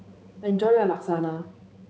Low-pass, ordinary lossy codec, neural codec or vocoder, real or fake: none; none; codec, 16 kHz, 4 kbps, X-Codec, HuBERT features, trained on balanced general audio; fake